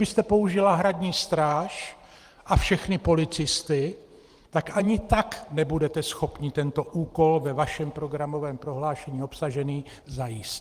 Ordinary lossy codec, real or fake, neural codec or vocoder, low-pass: Opus, 24 kbps; fake; vocoder, 44.1 kHz, 128 mel bands every 512 samples, BigVGAN v2; 14.4 kHz